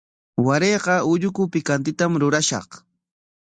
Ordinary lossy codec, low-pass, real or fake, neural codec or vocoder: Opus, 64 kbps; 9.9 kHz; real; none